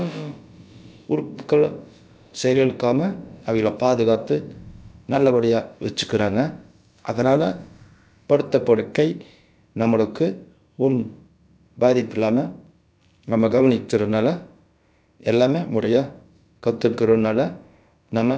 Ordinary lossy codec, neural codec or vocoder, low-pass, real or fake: none; codec, 16 kHz, about 1 kbps, DyCAST, with the encoder's durations; none; fake